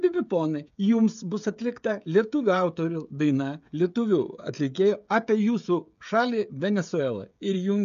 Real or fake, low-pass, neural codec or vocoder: fake; 7.2 kHz; codec, 16 kHz, 16 kbps, FreqCodec, smaller model